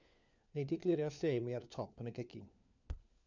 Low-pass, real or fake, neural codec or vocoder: 7.2 kHz; fake; codec, 16 kHz, 4 kbps, FunCodec, trained on LibriTTS, 50 frames a second